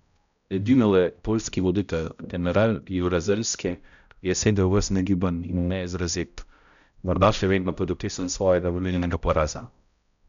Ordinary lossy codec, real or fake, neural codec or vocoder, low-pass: none; fake; codec, 16 kHz, 0.5 kbps, X-Codec, HuBERT features, trained on balanced general audio; 7.2 kHz